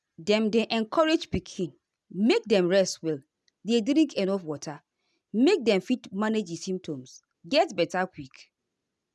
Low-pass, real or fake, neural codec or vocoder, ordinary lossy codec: none; real; none; none